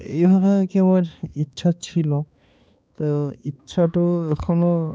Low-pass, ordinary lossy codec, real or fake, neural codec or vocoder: none; none; fake; codec, 16 kHz, 2 kbps, X-Codec, HuBERT features, trained on balanced general audio